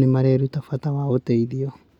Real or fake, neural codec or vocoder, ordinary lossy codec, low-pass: real; none; none; 19.8 kHz